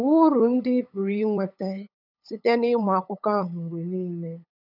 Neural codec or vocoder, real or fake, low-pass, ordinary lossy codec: codec, 16 kHz, 8 kbps, FunCodec, trained on LibriTTS, 25 frames a second; fake; 5.4 kHz; none